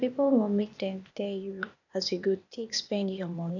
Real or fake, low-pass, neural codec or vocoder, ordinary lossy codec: fake; 7.2 kHz; codec, 16 kHz, 0.8 kbps, ZipCodec; none